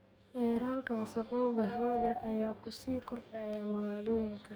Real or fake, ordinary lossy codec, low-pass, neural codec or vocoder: fake; none; none; codec, 44.1 kHz, 2.6 kbps, DAC